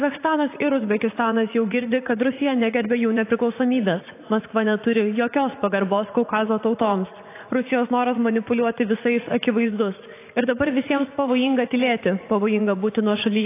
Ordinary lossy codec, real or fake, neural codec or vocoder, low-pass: AAC, 24 kbps; fake; vocoder, 44.1 kHz, 80 mel bands, Vocos; 3.6 kHz